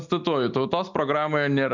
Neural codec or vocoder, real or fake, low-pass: none; real; 7.2 kHz